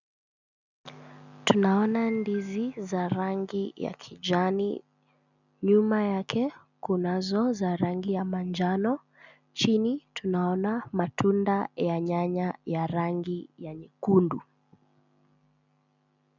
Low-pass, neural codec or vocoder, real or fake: 7.2 kHz; none; real